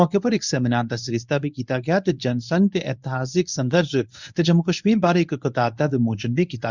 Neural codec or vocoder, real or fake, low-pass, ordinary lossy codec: codec, 24 kHz, 0.9 kbps, WavTokenizer, medium speech release version 1; fake; 7.2 kHz; none